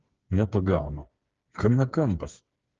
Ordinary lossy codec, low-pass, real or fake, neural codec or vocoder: Opus, 16 kbps; 7.2 kHz; fake; codec, 16 kHz, 4 kbps, FreqCodec, smaller model